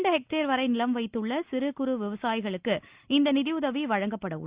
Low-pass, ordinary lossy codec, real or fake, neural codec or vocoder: 3.6 kHz; Opus, 64 kbps; real; none